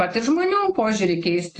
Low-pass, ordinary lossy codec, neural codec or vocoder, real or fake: 10.8 kHz; AAC, 32 kbps; none; real